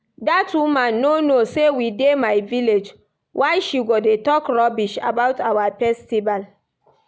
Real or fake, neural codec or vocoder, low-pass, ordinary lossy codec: real; none; none; none